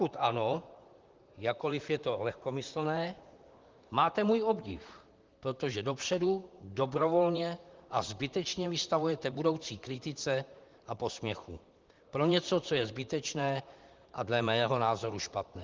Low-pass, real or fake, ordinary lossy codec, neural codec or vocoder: 7.2 kHz; fake; Opus, 32 kbps; vocoder, 44.1 kHz, 128 mel bands, Pupu-Vocoder